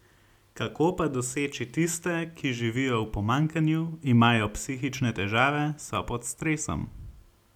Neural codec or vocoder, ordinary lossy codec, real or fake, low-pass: none; none; real; 19.8 kHz